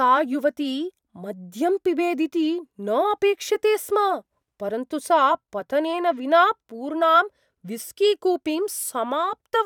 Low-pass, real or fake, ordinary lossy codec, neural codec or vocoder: 19.8 kHz; fake; none; vocoder, 44.1 kHz, 128 mel bands every 512 samples, BigVGAN v2